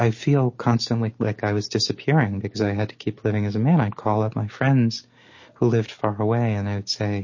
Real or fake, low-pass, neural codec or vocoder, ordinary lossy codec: real; 7.2 kHz; none; MP3, 32 kbps